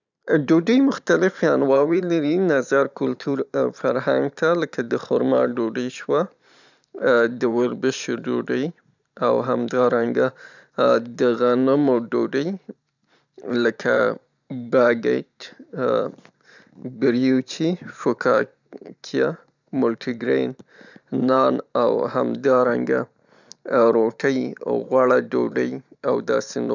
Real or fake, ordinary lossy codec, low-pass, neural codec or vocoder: fake; none; 7.2 kHz; vocoder, 44.1 kHz, 128 mel bands every 512 samples, BigVGAN v2